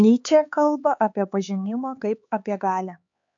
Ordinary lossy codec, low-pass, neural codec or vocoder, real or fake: MP3, 48 kbps; 7.2 kHz; codec, 16 kHz, 4 kbps, X-Codec, HuBERT features, trained on LibriSpeech; fake